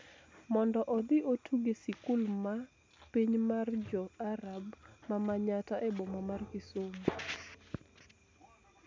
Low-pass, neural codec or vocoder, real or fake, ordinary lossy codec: none; none; real; none